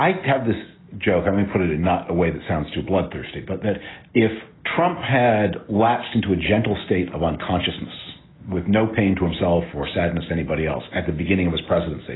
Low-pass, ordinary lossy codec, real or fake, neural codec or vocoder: 7.2 kHz; AAC, 16 kbps; real; none